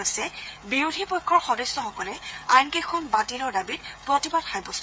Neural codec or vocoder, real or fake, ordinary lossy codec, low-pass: codec, 16 kHz, 8 kbps, FreqCodec, smaller model; fake; none; none